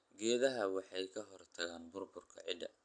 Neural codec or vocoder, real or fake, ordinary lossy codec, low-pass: none; real; AAC, 64 kbps; 9.9 kHz